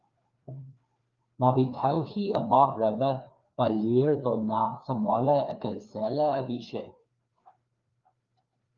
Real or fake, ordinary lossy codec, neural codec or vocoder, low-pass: fake; Opus, 32 kbps; codec, 16 kHz, 2 kbps, FreqCodec, larger model; 7.2 kHz